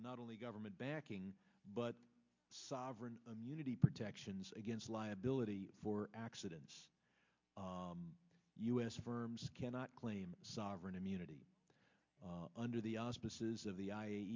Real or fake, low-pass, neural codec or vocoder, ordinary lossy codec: real; 7.2 kHz; none; MP3, 64 kbps